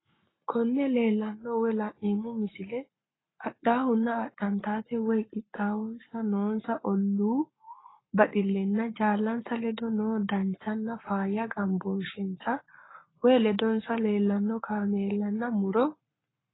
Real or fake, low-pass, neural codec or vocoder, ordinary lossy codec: fake; 7.2 kHz; codec, 44.1 kHz, 7.8 kbps, Pupu-Codec; AAC, 16 kbps